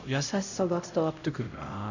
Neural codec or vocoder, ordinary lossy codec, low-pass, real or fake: codec, 16 kHz, 0.5 kbps, X-Codec, HuBERT features, trained on LibriSpeech; none; 7.2 kHz; fake